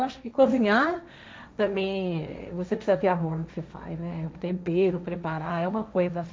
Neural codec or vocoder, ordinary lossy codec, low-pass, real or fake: codec, 16 kHz, 1.1 kbps, Voila-Tokenizer; none; none; fake